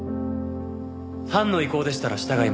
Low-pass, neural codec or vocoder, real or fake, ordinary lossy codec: none; none; real; none